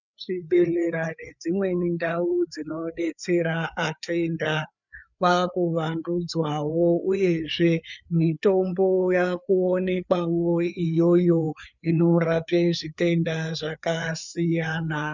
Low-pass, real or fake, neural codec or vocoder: 7.2 kHz; fake; codec, 16 kHz, 4 kbps, FreqCodec, larger model